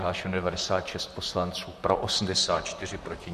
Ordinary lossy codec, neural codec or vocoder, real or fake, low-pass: MP3, 64 kbps; vocoder, 44.1 kHz, 128 mel bands, Pupu-Vocoder; fake; 14.4 kHz